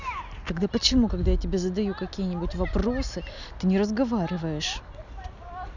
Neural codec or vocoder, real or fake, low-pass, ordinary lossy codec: none; real; 7.2 kHz; none